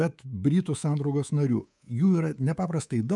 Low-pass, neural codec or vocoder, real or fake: 10.8 kHz; codec, 24 kHz, 3.1 kbps, DualCodec; fake